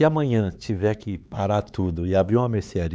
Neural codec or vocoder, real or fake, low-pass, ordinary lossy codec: codec, 16 kHz, 4 kbps, X-Codec, HuBERT features, trained on LibriSpeech; fake; none; none